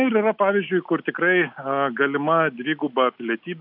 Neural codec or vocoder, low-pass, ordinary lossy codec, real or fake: none; 5.4 kHz; AAC, 48 kbps; real